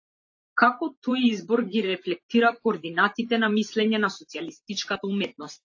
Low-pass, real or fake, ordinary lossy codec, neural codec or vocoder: 7.2 kHz; fake; AAC, 48 kbps; vocoder, 44.1 kHz, 128 mel bands every 512 samples, BigVGAN v2